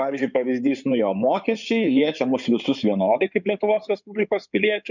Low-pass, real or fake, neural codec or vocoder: 7.2 kHz; fake; codec, 16 kHz in and 24 kHz out, 2.2 kbps, FireRedTTS-2 codec